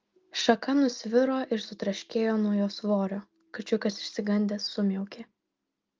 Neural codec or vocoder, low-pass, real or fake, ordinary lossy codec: none; 7.2 kHz; real; Opus, 24 kbps